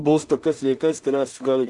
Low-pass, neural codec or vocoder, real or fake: 10.8 kHz; codec, 16 kHz in and 24 kHz out, 0.4 kbps, LongCat-Audio-Codec, two codebook decoder; fake